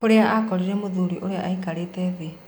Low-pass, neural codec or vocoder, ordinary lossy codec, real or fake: 19.8 kHz; none; MP3, 96 kbps; real